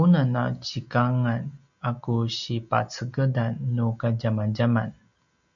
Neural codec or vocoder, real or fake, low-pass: none; real; 7.2 kHz